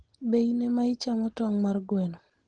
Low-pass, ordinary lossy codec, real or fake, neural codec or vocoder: 9.9 kHz; Opus, 16 kbps; real; none